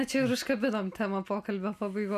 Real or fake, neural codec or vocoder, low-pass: real; none; 14.4 kHz